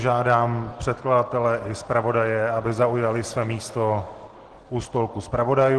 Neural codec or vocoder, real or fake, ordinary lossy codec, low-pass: none; real; Opus, 16 kbps; 10.8 kHz